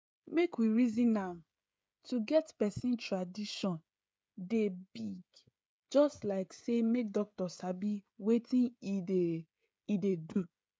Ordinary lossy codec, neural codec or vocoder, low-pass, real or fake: none; codec, 16 kHz, 16 kbps, FreqCodec, smaller model; none; fake